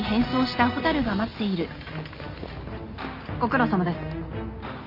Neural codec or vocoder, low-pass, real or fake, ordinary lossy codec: none; 5.4 kHz; real; none